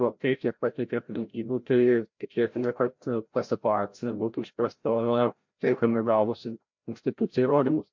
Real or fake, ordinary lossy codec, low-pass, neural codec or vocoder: fake; MP3, 48 kbps; 7.2 kHz; codec, 16 kHz, 0.5 kbps, FreqCodec, larger model